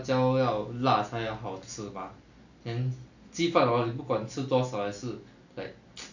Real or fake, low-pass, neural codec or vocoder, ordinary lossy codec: real; 7.2 kHz; none; none